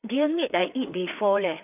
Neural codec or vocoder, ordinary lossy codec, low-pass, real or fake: codec, 16 kHz, 4 kbps, FreqCodec, larger model; none; 3.6 kHz; fake